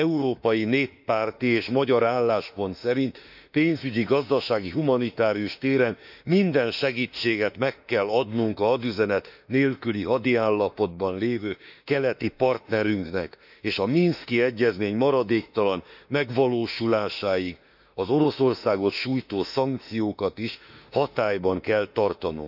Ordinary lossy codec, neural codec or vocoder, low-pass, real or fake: none; autoencoder, 48 kHz, 32 numbers a frame, DAC-VAE, trained on Japanese speech; 5.4 kHz; fake